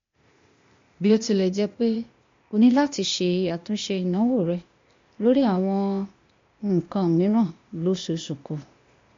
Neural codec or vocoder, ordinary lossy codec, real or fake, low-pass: codec, 16 kHz, 0.8 kbps, ZipCodec; MP3, 48 kbps; fake; 7.2 kHz